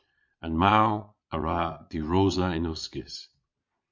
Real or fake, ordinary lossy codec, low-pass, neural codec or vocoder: fake; MP3, 48 kbps; 7.2 kHz; vocoder, 22.05 kHz, 80 mel bands, Vocos